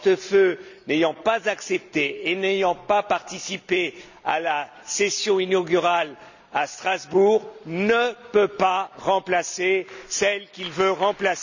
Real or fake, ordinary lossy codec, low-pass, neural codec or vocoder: real; none; 7.2 kHz; none